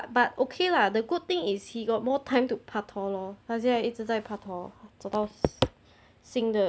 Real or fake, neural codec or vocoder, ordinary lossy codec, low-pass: real; none; none; none